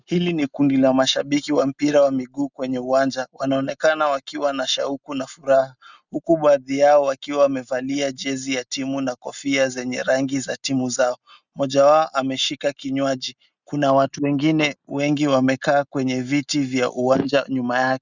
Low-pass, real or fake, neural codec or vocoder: 7.2 kHz; real; none